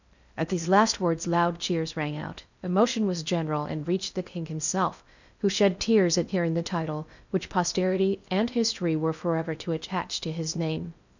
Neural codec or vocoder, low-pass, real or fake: codec, 16 kHz in and 24 kHz out, 0.6 kbps, FocalCodec, streaming, 2048 codes; 7.2 kHz; fake